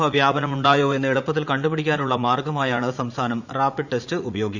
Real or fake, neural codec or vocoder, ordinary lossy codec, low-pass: fake; vocoder, 44.1 kHz, 80 mel bands, Vocos; Opus, 64 kbps; 7.2 kHz